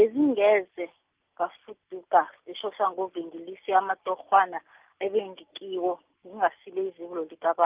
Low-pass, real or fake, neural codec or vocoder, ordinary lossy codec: 3.6 kHz; real; none; Opus, 32 kbps